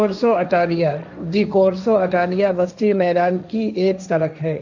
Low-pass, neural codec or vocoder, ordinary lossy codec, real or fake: 7.2 kHz; codec, 16 kHz, 1.1 kbps, Voila-Tokenizer; none; fake